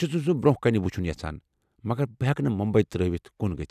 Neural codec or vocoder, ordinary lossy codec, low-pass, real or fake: none; none; 14.4 kHz; real